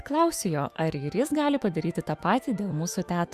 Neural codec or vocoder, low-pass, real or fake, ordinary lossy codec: autoencoder, 48 kHz, 128 numbers a frame, DAC-VAE, trained on Japanese speech; 14.4 kHz; fake; Opus, 64 kbps